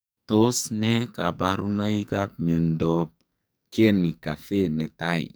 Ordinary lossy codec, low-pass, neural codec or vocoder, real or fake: none; none; codec, 44.1 kHz, 2.6 kbps, SNAC; fake